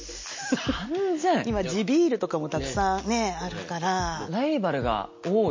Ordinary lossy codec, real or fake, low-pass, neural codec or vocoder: MP3, 32 kbps; real; 7.2 kHz; none